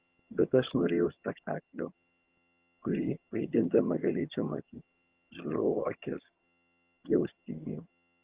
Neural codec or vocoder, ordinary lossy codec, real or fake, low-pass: vocoder, 22.05 kHz, 80 mel bands, HiFi-GAN; Opus, 24 kbps; fake; 3.6 kHz